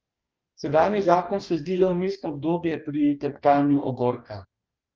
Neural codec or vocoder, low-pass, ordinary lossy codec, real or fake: codec, 44.1 kHz, 2.6 kbps, DAC; 7.2 kHz; Opus, 24 kbps; fake